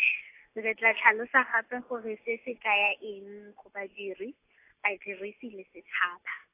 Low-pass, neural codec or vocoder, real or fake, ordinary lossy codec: 3.6 kHz; none; real; AAC, 24 kbps